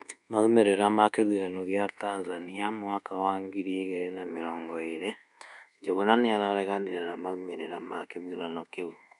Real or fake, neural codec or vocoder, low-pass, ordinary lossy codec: fake; codec, 24 kHz, 1.2 kbps, DualCodec; 10.8 kHz; none